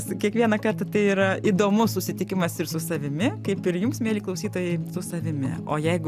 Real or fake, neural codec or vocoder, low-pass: real; none; 14.4 kHz